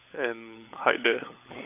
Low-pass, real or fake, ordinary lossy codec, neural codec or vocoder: 3.6 kHz; fake; none; codec, 16 kHz, 16 kbps, FunCodec, trained on LibriTTS, 50 frames a second